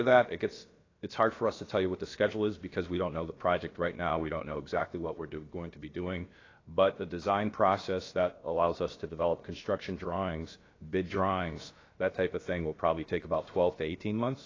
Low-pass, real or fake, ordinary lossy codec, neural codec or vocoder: 7.2 kHz; fake; AAC, 32 kbps; codec, 16 kHz, about 1 kbps, DyCAST, with the encoder's durations